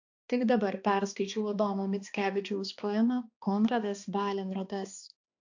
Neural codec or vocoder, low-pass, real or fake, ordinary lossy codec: codec, 16 kHz, 2 kbps, X-Codec, HuBERT features, trained on balanced general audio; 7.2 kHz; fake; AAC, 48 kbps